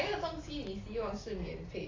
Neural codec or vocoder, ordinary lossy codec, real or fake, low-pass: none; AAC, 32 kbps; real; 7.2 kHz